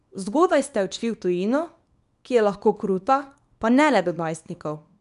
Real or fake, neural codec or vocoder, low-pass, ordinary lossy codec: fake; codec, 24 kHz, 0.9 kbps, WavTokenizer, small release; 10.8 kHz; none